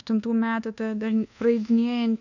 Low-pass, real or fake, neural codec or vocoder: 7.2 kHz; fake; codec, 24 kHz, 1.2 kbps, DualCodec